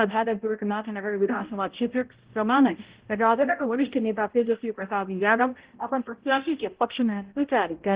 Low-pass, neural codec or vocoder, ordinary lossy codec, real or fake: 3.6 kHz; codec, 16 kHz, 0.5 kbps, X-Codec, HuBERT features, trained on balanced general audio; Opus, 16 kbps; fake